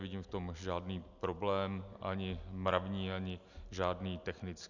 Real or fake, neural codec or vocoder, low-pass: real; none; 7.2 kHz